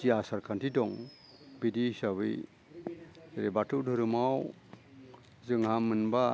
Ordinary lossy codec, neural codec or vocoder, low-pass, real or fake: none; none; none; real